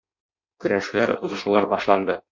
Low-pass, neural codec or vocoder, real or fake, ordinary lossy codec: 7.2 kHz; codec, 16 kHz in and 24 kHz out, 0.6 kbps, FireRedTTS-2 codec; fake; MP3, 48 kbps